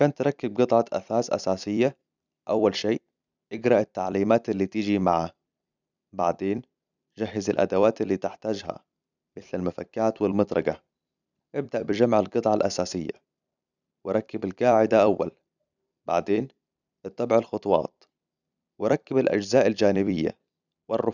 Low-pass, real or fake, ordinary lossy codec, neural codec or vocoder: 7.2 kHz; fake; none; vocoder, 44.1 kHz, 128 mel bands every 256 samples, BigVGAN v2